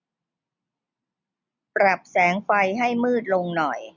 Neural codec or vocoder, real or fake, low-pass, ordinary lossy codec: none; real; 7.2 kHz; none